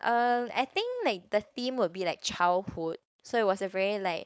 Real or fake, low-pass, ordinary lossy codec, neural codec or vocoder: fake; none; none; codec, 16 kHz, 4.8 kbps, FACodec